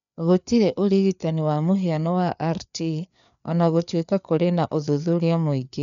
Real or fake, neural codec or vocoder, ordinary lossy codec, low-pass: fake; codec, 16 kHz, 4 kbps, FreqCodec, larger model; none; 7.2 kHz